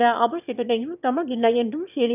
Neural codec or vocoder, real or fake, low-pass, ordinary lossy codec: autoencoder, 22.05 kHz, a latent of 192 numbers a frame, VITS, trained on one speaker; fake; 3.6 kHz; none